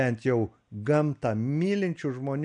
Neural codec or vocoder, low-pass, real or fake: none; 9.9 kHz; real